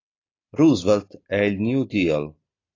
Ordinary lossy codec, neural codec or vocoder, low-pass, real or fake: AAC, 32 kbps; none; 7.2 kHz; real